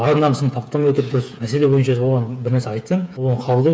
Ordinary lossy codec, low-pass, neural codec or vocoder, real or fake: none; none; codec, 16 kHz, 8 kbps, FreqCodec, smaller model; fake